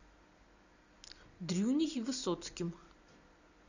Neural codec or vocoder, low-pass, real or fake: none; 7.2 kHz; real